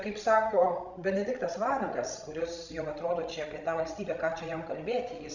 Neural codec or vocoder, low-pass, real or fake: codec, 16 kHz, 16 kbps, FreqCodec, larger model; 7.2 kHz; fake